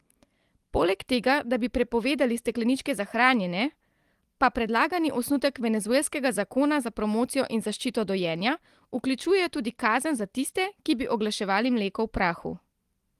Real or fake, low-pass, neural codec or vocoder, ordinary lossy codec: real; 14.4 kHz; none; Opus, 24 kbps